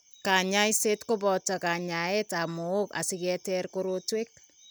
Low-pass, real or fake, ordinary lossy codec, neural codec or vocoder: none; real; none; none